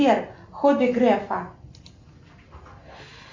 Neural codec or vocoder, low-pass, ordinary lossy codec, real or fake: none; 7.2 kHz; MP3, 48 kbps; real